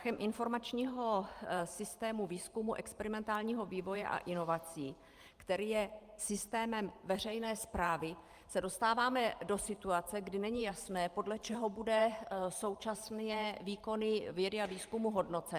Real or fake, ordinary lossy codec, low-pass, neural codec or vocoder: fake; Opus, 32 kbps; 14.4 kHz; vocoder, 44.1 kHz, 128 mel bands every 512 samples, BigVGAN v2